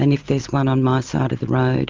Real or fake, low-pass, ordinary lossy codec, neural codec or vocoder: real; 7.2 kHz; Opus, 24 kbps; none